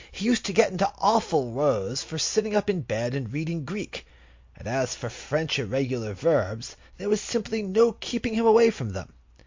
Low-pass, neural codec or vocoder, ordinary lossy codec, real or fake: 7.2 kHz; none; MP3, 48 kbps; real